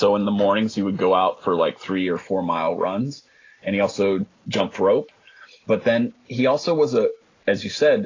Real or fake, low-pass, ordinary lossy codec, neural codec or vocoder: real; 7.2 kHz; AAC, 32 kbps; none